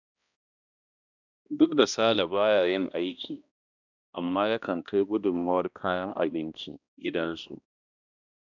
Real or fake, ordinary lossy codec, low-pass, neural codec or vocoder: fake; none; 7.2 kHz; codec, 16 kHz, 1 kbps, X-Codec, HuBERT features, trained on balanced general audio